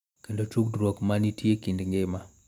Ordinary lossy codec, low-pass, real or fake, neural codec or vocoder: none; 19.8 kHz; real; none